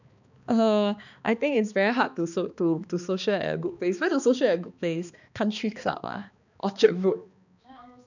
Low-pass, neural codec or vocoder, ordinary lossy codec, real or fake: 7.2 kHz; codec, 16 kHz, 2 kbps, X-Codec, HuBERT features, trained on balanced general audio; none; fake